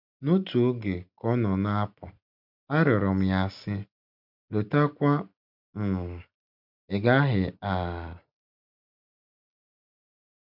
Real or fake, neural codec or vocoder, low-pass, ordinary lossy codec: real; none; 5.4 kHz; MP3, 48 kbps